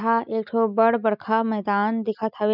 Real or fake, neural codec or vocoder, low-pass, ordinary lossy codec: real; none; 5.4 kHz; none